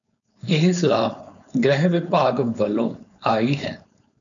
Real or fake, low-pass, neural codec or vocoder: fake; 7.2 kHz; codec, 16 kHz, 4.8 kbps, FACodec